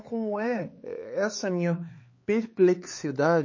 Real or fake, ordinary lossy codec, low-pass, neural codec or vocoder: fake; MP3, 32 kbps; 7.2 kHz; codec, 16 kHz, 4 kbps, X-Codec, HuBERT features, trained on LibriSpeech